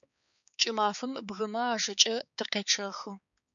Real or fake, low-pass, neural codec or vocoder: fake; 7.2 kHz; codec, 16 kHz, 4 kbps, X-Codec, HuBERT features, trained on balanced general audio